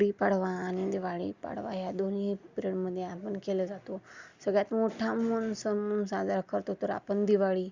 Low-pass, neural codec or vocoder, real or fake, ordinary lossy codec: 7.2 kHz; none; real; Opus, 64 kbps